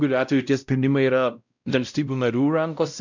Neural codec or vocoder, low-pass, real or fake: codec, 16 kHz, 0.5 kbps, X-Codec, WavLM features, trained on Multilingual LibriSpeech; 7.2 kHz; fake